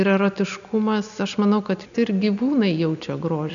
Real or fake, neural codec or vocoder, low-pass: real; none; 7.2 kHz